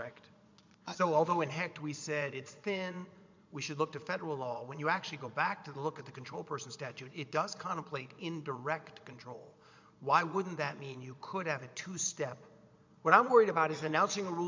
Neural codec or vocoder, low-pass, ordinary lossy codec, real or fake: vocoder, 22.05 kHz, 80 mel bands, Vocos; 7.2 kHz; MP3, 64 kbps; fake